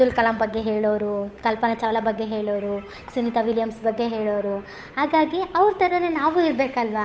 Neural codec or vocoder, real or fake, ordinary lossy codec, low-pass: codec, 16 kHz, 8 kbps, FunCodec, trained on Chinese and English, 25 frames a second; fake; none; none